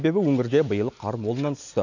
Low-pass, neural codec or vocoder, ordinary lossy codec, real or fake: 7.2 kHz; none; none; real